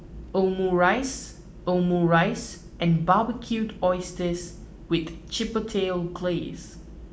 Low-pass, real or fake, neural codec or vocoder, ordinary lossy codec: none; real; none; none